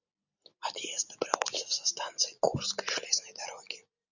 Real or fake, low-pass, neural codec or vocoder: real; 7.2 kHz; none